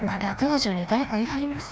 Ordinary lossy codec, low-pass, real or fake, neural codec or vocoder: none; none; fake; codec, 16 kHz, 1 kbps, FreqCodec, larger model